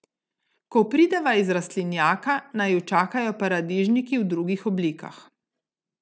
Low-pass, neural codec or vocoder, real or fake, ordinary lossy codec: none; none; real; none